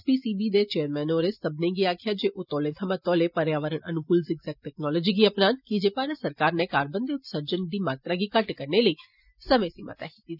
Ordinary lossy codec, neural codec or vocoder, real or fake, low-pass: none; none; real; 5.4 kHz